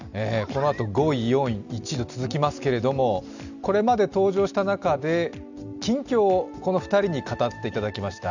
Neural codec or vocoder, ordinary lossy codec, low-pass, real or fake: none; none; 7.2 kHz; real